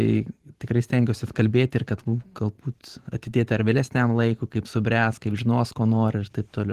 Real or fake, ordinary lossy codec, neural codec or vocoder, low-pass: fake; Opus, 16 kbps; vocoder, 44.1 kHz, 128 mel bands every 512 samples, BigVGAN v2; 14.4 kHz